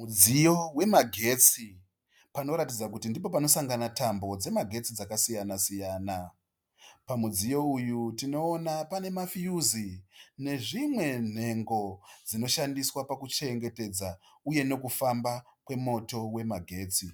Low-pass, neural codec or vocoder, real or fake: 19.8 kHz; none; real